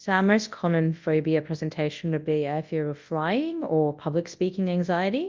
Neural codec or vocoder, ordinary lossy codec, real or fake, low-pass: codec, 24 kHz, 0.9 kbps, WavTokenizer, large speech release; Opus, 24 kbps; fake; 7.2 kHz